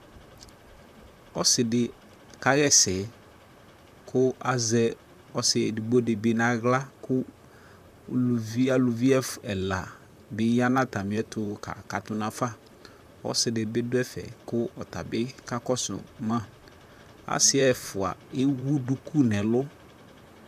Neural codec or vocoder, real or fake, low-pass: vocoder, 44.1 kHz, 128 mel bands, Pupu-Vocoder; fake; 14.4 kHz